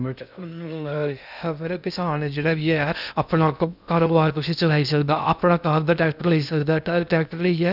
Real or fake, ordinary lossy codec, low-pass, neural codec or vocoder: fake; none; 5.4 kHz; codec, 16 kHz in and 24 kHz out, 0.6 kbps, FocalCodec, streaming, 2048 codes